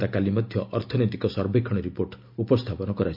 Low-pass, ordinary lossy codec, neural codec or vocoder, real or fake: 5.4 kHz; MP3, 48 kbps; none; real